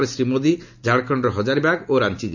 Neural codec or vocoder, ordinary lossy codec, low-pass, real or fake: none; none; 7.2 kHz; real